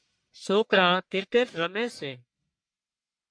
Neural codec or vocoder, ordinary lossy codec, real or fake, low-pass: codec, 44.1 kHz, 1.7 kbps, Pupu-Codec; MP3, 64 kbps; fake; 9.9 kHz